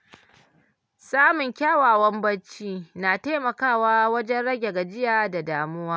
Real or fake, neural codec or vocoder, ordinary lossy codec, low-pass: real; none; none; none